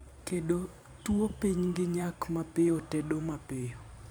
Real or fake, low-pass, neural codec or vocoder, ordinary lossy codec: fake; none; vocoder, 44.1 kHz, 128 mel bands every 512 samples, BigVGAN v2; none